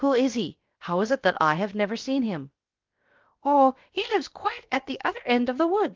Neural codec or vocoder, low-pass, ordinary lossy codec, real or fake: codec, 16 kHz, about 1 kbps, DyCAST, with the encoder's durations; 7.2 kHz; Opus, 32 kbps; fake